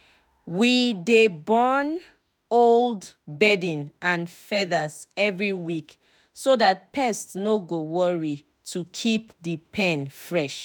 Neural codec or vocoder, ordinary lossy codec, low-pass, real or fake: autoencoder, 48 kHz, 32 numbers a frame, DAC-VAE, trained on Japanese speech; none; none; fake